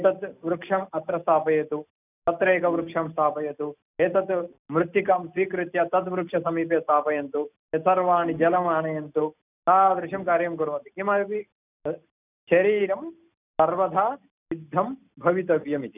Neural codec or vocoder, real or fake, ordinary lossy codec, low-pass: none; real; none; 3.6 kHz